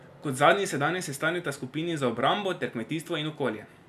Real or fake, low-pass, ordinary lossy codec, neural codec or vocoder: real; 14.4 kHz; none; none